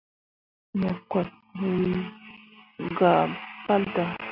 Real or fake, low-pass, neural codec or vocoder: real; 5.4 kHz; none